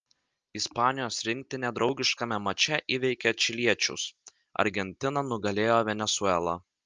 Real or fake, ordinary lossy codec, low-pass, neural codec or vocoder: real; Opus, 24 kbps; 7.2 kHz; none